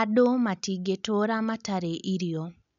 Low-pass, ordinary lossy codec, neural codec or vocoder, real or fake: 7.2 kHz; none; none; real